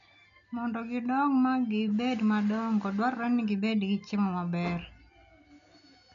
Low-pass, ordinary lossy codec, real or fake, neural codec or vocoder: 7.2 kHz; none; real; none